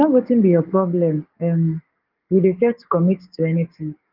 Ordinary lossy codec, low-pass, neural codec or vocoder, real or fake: Opus, 32 kbps; 5.4 kHz; codec, 44.1 kHz, 7.8 kbps, DAC; fake